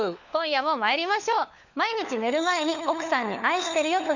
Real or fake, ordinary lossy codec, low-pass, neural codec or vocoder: fake; none; 7.2 kHz; codec, 16 kHz, 4 kbps, FunCodec, trained on LibriTTS, 50 frames a second